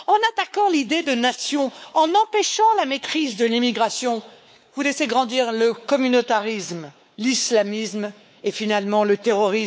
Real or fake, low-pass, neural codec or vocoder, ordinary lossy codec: fake; none; codec, 16 kHz, 4 kbps, X-Codec, WavLM features, trained on Multilingual LibriSpeech; none